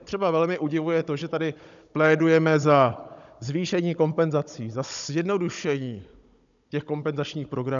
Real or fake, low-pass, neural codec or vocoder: fake; 7.2 kHz; codec, 16 kHz, 16 kbps, FunCodec, trained on Chinese and English, 50 frames a second